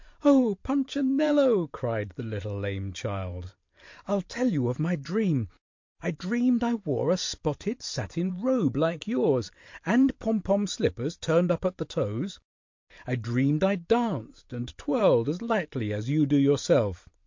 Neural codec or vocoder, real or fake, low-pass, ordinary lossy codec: vocoder, 44.1 kHz, 128 mel bands every 512 samples, BigVGAN v2; fake; 7.2 kHz; MP3, 48 kbps